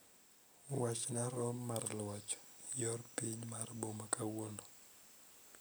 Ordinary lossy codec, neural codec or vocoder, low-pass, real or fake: none; none; none; real